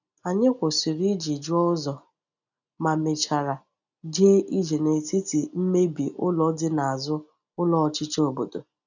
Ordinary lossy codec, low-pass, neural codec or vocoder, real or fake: none; 7.2 kHz; none; real